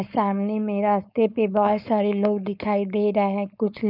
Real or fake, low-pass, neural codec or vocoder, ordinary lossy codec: fake; 5.4 kHz; codec, 16 kHz, 4.8 kbps, FACodec; none